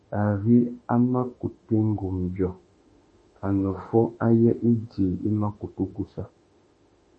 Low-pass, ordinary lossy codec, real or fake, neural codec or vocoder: 10.8 kHz; MP3, 32 kbps; fake; autoencoder, 48 kHz, 32 numbers a frame, DAC-VAE, trained on Japanese speech